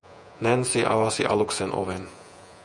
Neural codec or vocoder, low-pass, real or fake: vocoder, 48 kHz, 128 mel bands, Vocos; 10.8 kHz; fake